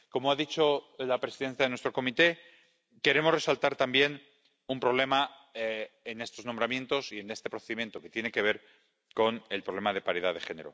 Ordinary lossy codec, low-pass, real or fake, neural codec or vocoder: none; none; real; none